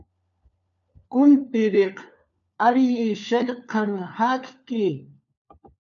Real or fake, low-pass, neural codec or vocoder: fake; 7.2 kHz; codec, 16 kHz, 4 kbps, FunCodec, trained on LibriTTS, 50 frames a second